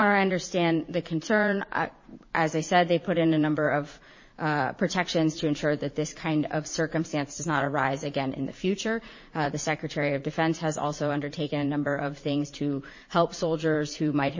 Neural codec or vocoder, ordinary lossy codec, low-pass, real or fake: vocoder, 44.1 kHz, 80 mel bands, Vocos; MP3, 32 kbps; 7.2 kHz; fake